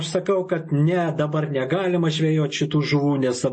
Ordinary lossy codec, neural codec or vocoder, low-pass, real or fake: MP3, 32 kbps; none; 9.9 kHz; real